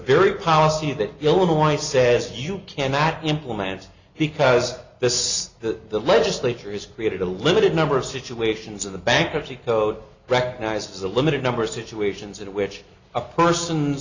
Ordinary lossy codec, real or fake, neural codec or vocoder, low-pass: Opus, 64 kbps; real; none; 7.2 kHz